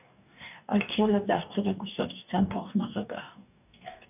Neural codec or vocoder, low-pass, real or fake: codec, 44.1 kHz, 2.6 kbps, DAC; 3.6 kHz; fake